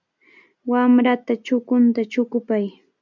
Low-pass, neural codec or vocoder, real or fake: 7.2 kHz; none; real